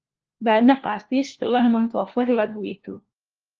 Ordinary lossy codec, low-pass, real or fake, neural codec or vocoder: Opus, 16 kbps; 7.2 kHz; fake; codec, 16 kHz, 1 kbps, FunCodec, trained on LibriTTS, 50 frames a second